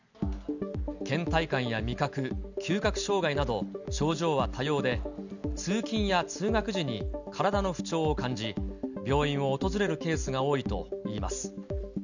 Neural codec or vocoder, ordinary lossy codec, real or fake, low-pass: none; none; real; 7.2 kHz